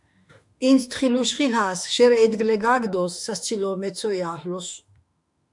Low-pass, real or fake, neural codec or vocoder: 10.8 kHz; fake; autoencoder, 48 kHz, 32 numbers a frame, DAC-VAE, trained on Japanese speech